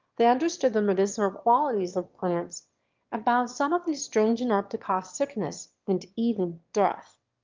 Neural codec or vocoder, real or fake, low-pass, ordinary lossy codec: autoencoder, 22.05 kHz, a latent of 192 numbers a frame, VITS, trained on one speaker; fake; 7.2 kHz; Opus, 32 kbps